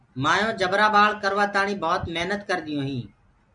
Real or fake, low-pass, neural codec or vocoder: real; 9.9 kHz; none